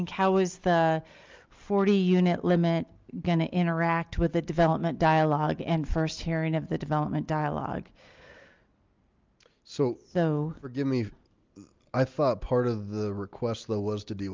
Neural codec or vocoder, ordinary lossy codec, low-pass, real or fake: none; Opus, 24 kbps; 7.2 kHz; real